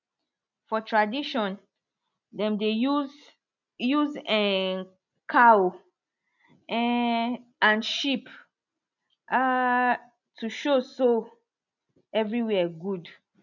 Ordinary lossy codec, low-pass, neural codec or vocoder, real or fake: none; 7.2 kHz; none; real